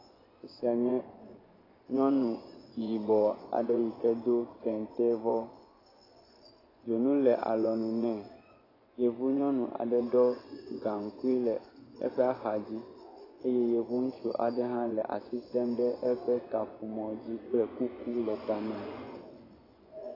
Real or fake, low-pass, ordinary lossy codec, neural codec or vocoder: fake; 5.4 kHz; AAC, 24 kbps; vocoder, 24 kHz, 100 mel bands, Vocos